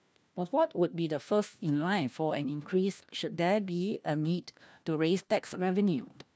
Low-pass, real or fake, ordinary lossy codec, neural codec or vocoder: none; fake; none; codec, 16 kHz, 1 kbps, FunCodec, trained on LibriTTS, 50 frames a second